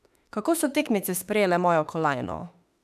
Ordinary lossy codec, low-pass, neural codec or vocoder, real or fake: none; 14.4 kHz; autoencoder, 48 kHz, 32 numbers a frame, DAC-VAE, trained on Japanese speech; fake